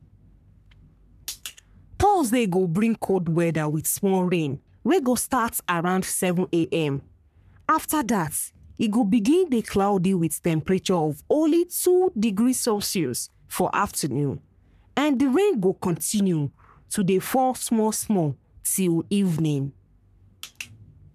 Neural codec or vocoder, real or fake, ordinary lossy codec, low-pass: codec, 44.1 kHz, 3.4 kbps, Pupu-Codec; fake; none; 14.4 kHz